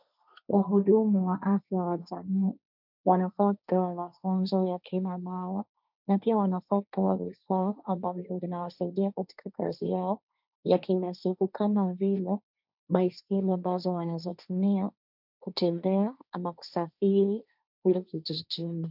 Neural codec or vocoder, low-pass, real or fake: codec, 16 kHz, 1.1 kbps, Voila-Tokenizer; 5.4 kHz; fake